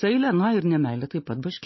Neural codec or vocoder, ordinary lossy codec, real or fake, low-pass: none; MP3, 24 kbps; real; 7.2 kHz